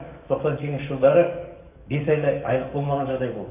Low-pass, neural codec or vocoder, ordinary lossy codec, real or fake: 3.6 kHz; codec, 44.1 kHz, 7.8 kbps, DAC; none; fake